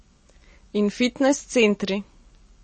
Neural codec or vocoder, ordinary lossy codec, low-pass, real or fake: none; MP3, 32 kbps; 9.9 kHz; real